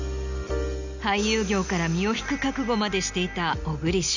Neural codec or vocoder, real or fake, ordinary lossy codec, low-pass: none; real; none; 7.2 kHz